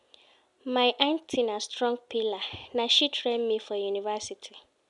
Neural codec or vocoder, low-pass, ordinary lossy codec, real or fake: none; 10.8 kHz; none; real